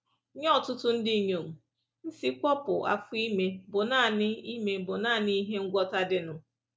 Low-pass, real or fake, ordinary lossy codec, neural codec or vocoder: none; real; none; none